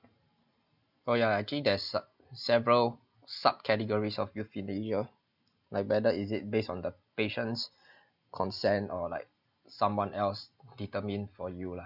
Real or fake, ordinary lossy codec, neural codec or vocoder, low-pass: real; none; none; 5.4 kHz